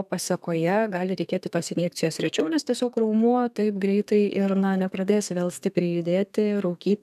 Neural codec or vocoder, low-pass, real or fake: codec, 32 kHz, 1.9 kbps, SNAC; 14.4 kHz; fake